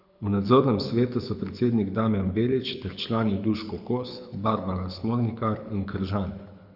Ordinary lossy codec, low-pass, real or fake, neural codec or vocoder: none; 5.4 kHz; fake; codec, 24 kHz, 6 kbps, HILCodec